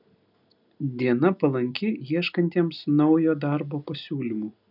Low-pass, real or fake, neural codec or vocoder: 5.4 kHz; real; none